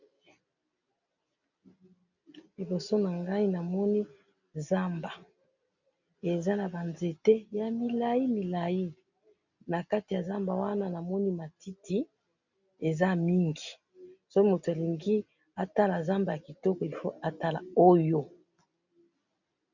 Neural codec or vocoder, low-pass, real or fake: none; 7.2 kHz; real